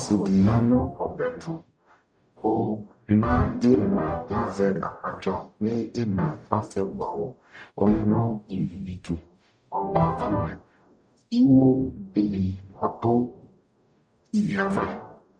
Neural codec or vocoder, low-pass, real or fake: codec, 44.1 kHz, 0.9 kbps, DAC; 9.9 kHz; fake